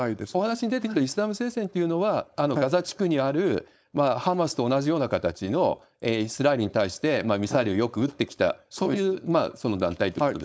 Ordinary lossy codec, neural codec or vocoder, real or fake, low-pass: none; codec, 16 kHz, 4.8 kbps, FACodec; fake; none